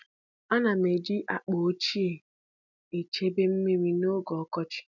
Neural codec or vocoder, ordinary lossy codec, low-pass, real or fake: none; none; 7.2 kHz; real